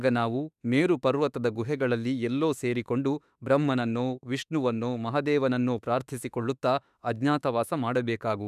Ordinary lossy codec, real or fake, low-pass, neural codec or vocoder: none; fake; 14.4 kHz; autoencoder, 48 kHz, 32 numbers a frame, DAC-VAE, trained on Japanese speech